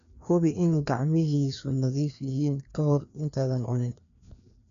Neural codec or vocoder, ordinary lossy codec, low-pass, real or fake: codec, 16 kHz, 2 kbps, FreqCodec, larger model; MP3, 96 kbps; 7.2 kHz; fake